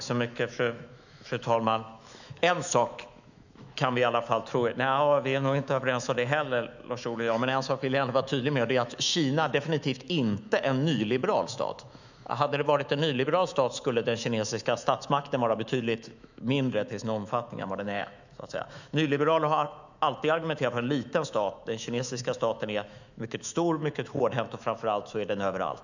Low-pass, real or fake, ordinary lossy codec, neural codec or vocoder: 7.2 kHz; fake; none; autoencoder, 48 kHz, 128 numbers a frame, DAC-VAE, trained on Japanese speech